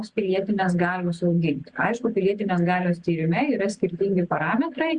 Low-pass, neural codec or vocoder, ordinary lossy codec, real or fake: 9.9 kHz; none; Opus, 64 kbps; real